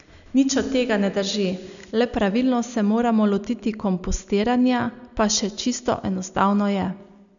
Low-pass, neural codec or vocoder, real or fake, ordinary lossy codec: 7.2 kHz; none; real; none